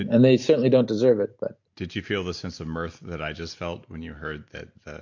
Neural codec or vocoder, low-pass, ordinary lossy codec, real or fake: none; 7.2 kHz; MP3, 48 kbps; real